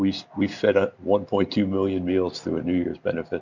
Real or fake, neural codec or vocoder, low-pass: real; none; 7.2 kHz